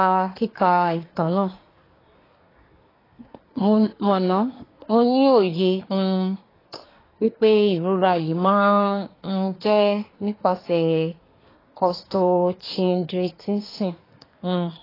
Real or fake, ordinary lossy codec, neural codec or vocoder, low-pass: fake; AAC, 24 kbps; codec, 24 kHz, 1 kbps, SNAC; 5.4 kHz